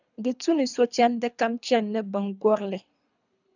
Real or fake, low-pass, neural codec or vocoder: fake; 7.2 kHz; codec, 24 kHz, 3 kbps, HILCodec